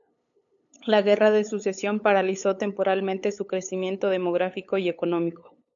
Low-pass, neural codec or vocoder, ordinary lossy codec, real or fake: 7.2 kHz; codec, 16 kHz, 8 kbps, FunCodec, trained on LibriTTS, 25 frames a second; AAC, 64 kbps; fake